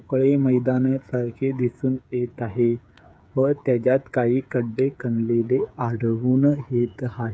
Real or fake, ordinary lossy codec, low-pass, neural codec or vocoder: fake; none; none; codec, 16 kHz, 8 kbps, FreqCodec, smaller model